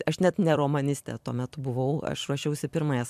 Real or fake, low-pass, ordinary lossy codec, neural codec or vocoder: fake; 14.4 kHz; MP3, 96 kbps; vocoder, 44.1 kHz, 128 mel bands every 256 samples, BigVGAN v2